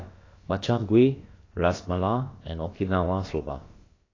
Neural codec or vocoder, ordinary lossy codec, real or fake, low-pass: codec, 16 kHz, about 1 kbps, DyCAST, with the encoder's durations; AAC, 32 kbps; fake; 7.2 kHz